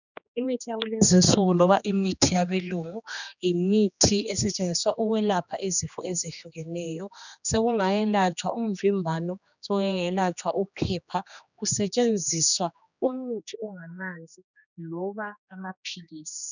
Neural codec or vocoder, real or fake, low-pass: codec, 16 kHz, 2 kbps, X-Codec, HuBERT features, trained on general audio; fake; 7.2 kHz